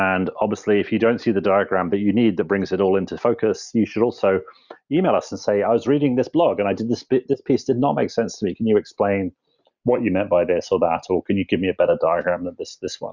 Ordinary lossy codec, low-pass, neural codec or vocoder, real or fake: Opus, 64 kbps; 7.2 kHz; none; real